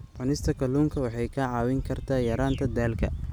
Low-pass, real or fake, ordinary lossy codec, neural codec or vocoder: 19.8 kHz; real; none; none